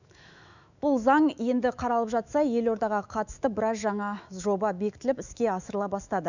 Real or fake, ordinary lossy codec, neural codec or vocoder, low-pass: fake; none; autoencoder, 48 kHz, 128 numbers a frame, DAC-VAE, trained on Japanese speech; 7.2 kHz